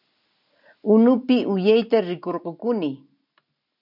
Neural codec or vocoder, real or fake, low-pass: none; real; 5.4 kHz